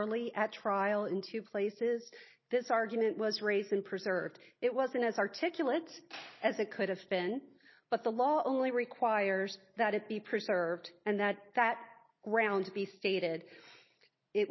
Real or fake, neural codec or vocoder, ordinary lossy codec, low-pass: real; none; MP3, 24 kbps; 7.2 kHz